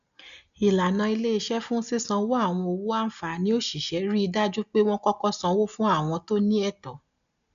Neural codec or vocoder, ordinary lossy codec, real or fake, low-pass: none; none; real; 7.2 kHz